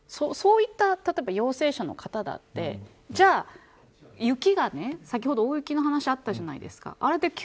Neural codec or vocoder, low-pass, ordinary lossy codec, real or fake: none; none; none; real